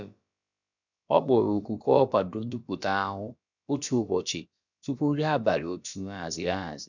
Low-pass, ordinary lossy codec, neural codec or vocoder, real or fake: 7.2 kHz; none; codec, 16 kHz, about 1 kbps, DyCAST, with the encoder's durations; fake